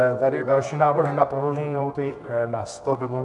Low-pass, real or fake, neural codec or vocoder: 10.8 kHz; fake; codec, 24 kHz, 0.9 kbps, WavTokenizer, medium music audio release